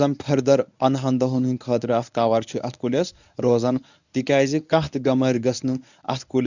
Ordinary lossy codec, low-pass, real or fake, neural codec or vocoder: none; 7.2 kHz; fake; codec, 24 kHz, 0.9 kbps, WavTokenizer, medium speech release version 1